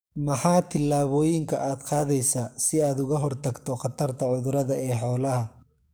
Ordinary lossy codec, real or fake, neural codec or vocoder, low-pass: none; fake; codec, 44.1 kHz, 7.8 kbps, DAC; none